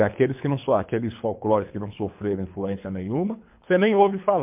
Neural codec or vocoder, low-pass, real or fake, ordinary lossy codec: codec, 24 kHz, 3 kbps, HILCodec; 3.6 kHz; fake; MP3, 32 kbps